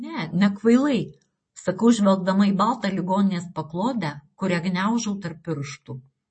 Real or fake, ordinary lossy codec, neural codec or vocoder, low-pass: real; MP3, 32 kbps; none; 9.9 kHz